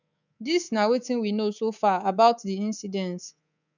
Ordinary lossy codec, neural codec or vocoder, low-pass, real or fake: none; codec, 24 kHz, 3.1 kbps, DualCodec; 7.2 kHz; fake